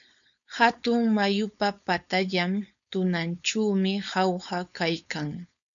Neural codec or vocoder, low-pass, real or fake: codec, 16 kHz, 4.8 kbps, FACodec; 7.2 kHz; fake